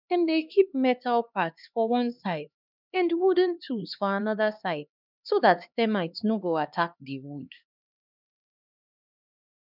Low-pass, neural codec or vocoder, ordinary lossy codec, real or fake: 5.4 kHz; codec, 16 kHz, 2 kbps, X-Codec, HuBERT features, trained on LibriSpeech; none; fake